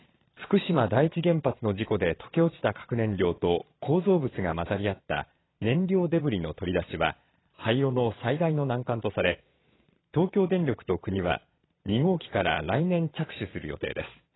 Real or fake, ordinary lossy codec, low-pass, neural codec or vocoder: fake; AAC, 16 kbps; 7.2 kHz; vocoder, 22.05 kHz, 80 mel bands, Vocos